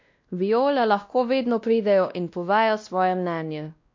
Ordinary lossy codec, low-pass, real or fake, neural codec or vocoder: MP3, 48 kbps; 7.2 kHz; fake; codec, 16 kHz, 1 kbps, X-Codec, WavLM features, trained on Multilingual LibriSpeech